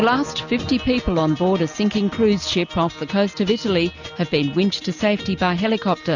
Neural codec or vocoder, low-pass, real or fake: none; 7.2 kHz; real